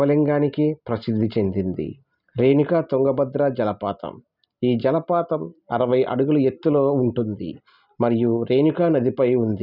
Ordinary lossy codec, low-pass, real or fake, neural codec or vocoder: none; 5.4 kHz; real; none